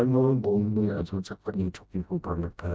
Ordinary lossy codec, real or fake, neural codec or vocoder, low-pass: none; fake; codec, 16 kHz, 0.5 kbps, FreqCodec, smaller model; none